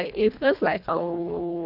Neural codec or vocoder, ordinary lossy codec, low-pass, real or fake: codec, 24 kHz, 1.5 kbps, HILCodec; none; 5.4 kHz; fake